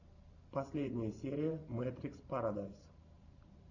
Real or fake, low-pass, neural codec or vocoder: fake; 7.2 kHz; vocoder, 44.1 kHz, 128 mel bands every 512 samples, BigVGAN v2